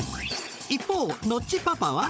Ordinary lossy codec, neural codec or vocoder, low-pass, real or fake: none; codec, 16 kHz, 16 kbps, FunCodec, trained on Chinese and English, 50 frames a second; none; fake